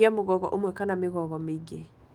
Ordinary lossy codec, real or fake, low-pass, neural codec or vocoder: Opus, 24 kbps; fake; 19.8 kHz; autoencoder, 48 kHz, 128 numbers a frame, DAC-VAE, trained on Japanese speech